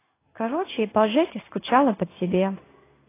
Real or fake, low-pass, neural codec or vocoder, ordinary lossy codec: fake; 3.6 kHz; codec, 16 kHz in and 24 kHz out, 1 kbps, XY-Tokenizer; AAC, 24 kbps